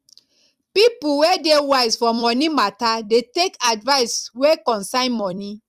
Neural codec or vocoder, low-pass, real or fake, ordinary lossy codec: vocoder, 44.1 kHz, 128 mel bands every 256 samples, BigVGAN v2; 14.4 kHz; fake; Opus, 64 kbps